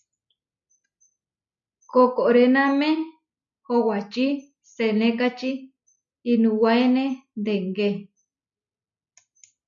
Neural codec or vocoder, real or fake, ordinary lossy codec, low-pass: none; real; MP3, 96 kbps; 7.2 kHz